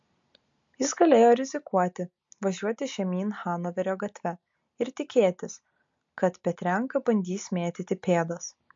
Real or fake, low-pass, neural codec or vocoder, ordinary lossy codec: real; 7.2 kHz; none; MP3, 48 kbps